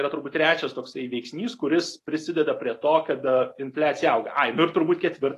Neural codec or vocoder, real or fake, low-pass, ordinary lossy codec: none; real; 14.4 kHz; AAC, 48 kbps